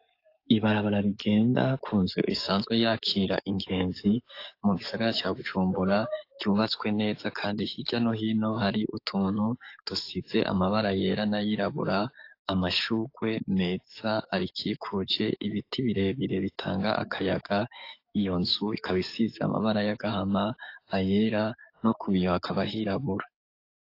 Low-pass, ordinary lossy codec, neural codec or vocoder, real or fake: 5.4 kHz; AAC, 32 kbps; codec, 44.1 kHz, 7.8 kbps, DAC; fake